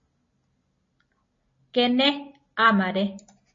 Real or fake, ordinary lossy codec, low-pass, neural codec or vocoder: real; MP3, 32 kbps; 7.2 kHz; none